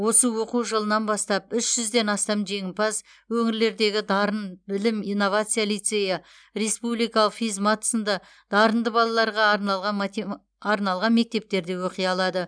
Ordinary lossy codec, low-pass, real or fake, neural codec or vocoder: none; 9.9 kHz; real; none